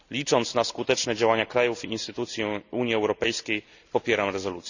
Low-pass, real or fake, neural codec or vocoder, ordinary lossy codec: 7.2 kHz; real; none; none